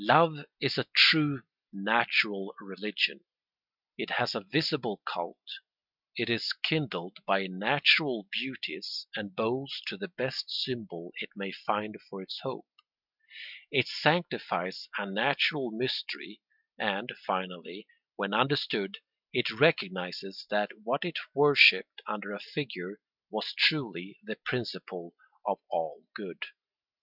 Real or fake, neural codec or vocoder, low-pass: real; none; 5.4 kHz